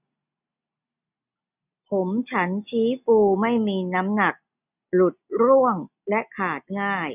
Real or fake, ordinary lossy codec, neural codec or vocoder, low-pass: real; none; none; 3.6 kHz